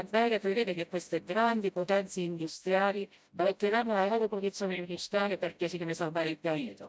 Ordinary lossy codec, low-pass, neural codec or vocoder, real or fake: none; none; codec, 16 kHz, 0.5 kbps, FreqCodec, smaller model; fake